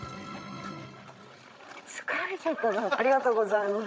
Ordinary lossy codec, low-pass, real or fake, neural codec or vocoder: none; none; fake; codec, 16 kHz, 16 kbps, FreqCodec, larger model